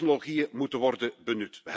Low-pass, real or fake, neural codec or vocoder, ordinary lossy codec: none; real; none; none